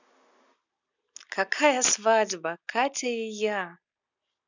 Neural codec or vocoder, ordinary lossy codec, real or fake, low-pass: none; none; real; 7.2 kHz